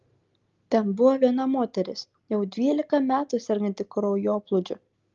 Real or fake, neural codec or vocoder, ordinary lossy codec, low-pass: real; none; Opus, 32 kbps; 7.2 kHz